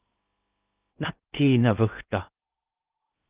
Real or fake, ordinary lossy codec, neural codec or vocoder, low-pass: fake; Opus, 24 kbps; codec, 16 kHz in and 24 kHz out, 0.6 kbps, FocalCodec, streaming, 2048 codes; 3.6 kHz